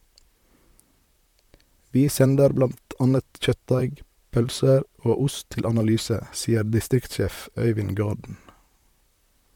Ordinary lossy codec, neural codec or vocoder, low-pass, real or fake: MP3, 96 kbps; vocoder, 44.1 kHz, 128 mel bands, Pupu-Vocoder; 19.8 kHz; fake